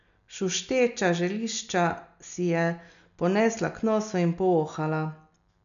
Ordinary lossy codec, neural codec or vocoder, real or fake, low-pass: none; none; real; 7.2 kHz